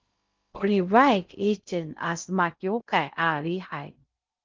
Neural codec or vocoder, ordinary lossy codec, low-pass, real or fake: codec, 16 kHz in and 24 kHz out, 0.6 kbps, FocalCodec, streaming, 2048 codes; Opus, 32 kbps; 7.2 kHz; fake